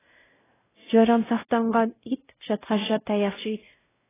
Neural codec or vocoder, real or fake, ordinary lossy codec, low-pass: codec, 16 kHz, 0.5 kbps, X-Codec, WavLM features, trained on Multilingual LibriSpeech; fake; AAC, 16 kbps; 3.6 kHz